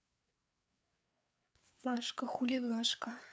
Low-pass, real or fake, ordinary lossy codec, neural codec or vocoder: none; fake; none; codec, 16 kHz, 4 kbps, FreqCodec, larger model